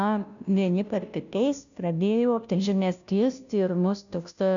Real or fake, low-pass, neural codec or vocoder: fake; 7.2 kHz; codec, 16 kHz, 0.5 kbps, FunCodec, trained on Chinese and English, 25 frames a second